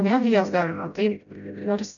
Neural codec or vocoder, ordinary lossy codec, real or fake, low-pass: codec, 16 kHz, 0.5 kbps, FreqCodec, smaller model; MP3, 96 kbps; fake; 7.2 kHz